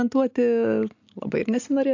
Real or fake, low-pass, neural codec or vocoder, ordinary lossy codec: real; 7.2 kHz; none; AAC, 48 kbps